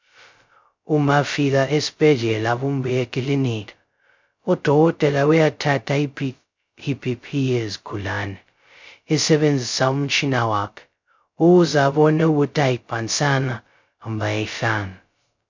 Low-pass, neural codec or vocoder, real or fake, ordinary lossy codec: 7.2 kHz; codec, 16 kHz, 0.2 kbps, FocalCodec; fake; MP3, 64 kbps